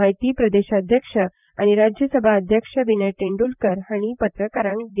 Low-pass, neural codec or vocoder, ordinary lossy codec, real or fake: 3.6 kHz; vocoder, 44.1 kHz, 128 mel bands, Pupu-Vocoder; none; fake